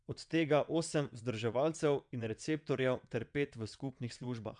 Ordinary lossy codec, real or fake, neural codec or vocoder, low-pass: none; fake; vocoder, 22.05 kHz, 80 mel bands, WaveNeXt; 9.9 kHz